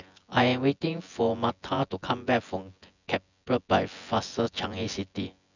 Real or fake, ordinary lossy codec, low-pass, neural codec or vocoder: fake; none; 7.2 kHz; vocoder, 24 kHz, 100 mel bands, Vocos